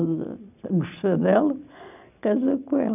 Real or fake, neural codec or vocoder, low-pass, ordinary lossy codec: real; none; 3.6 kHz; none